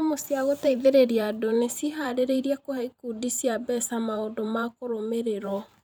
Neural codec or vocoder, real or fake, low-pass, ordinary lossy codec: vocoder, 44.1 kHz, 128 mel bands every 512 samples, BigVGAN v2; fake; none; none